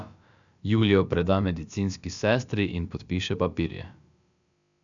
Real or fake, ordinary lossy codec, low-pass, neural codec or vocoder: fake; none; 7.2 kHz; codec, 16 kHz, about 1 kbps, DyCAST, with the encoder's durations